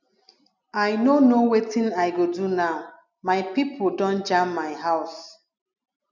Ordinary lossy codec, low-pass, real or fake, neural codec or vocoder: none; 7.2 kHz; real; none